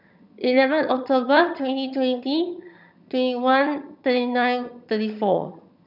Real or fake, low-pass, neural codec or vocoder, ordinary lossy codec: fake; 5.4 kHz; vocoder, 22.05 kHz, 80 mel bands, HiFi-GAN; none